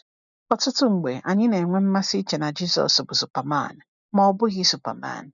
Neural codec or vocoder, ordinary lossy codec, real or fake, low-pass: none; none; real; 7.2 kHz